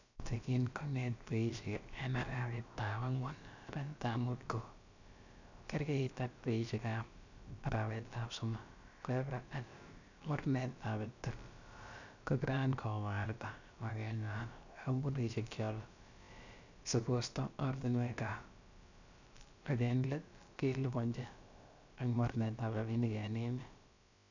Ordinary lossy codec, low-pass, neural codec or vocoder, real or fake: none; 7.2 kHz; codec, 16 kHz, about 1 kbps, DyCAST, with the encoder's durations; fake